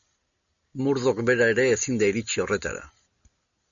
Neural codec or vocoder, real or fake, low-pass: none; real; 7.2 kHz